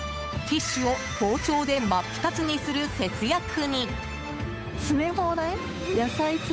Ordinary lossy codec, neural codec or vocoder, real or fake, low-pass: none; codec, 16 kHz, 8 kbps, FunCodec, trained on Chinese and English, 25 frames a second; fake; none